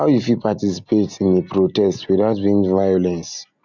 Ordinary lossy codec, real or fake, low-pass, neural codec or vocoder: none; real; 7.2 kHz; none